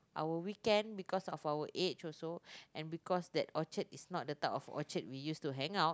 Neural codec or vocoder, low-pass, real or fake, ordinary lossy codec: none; none; real; none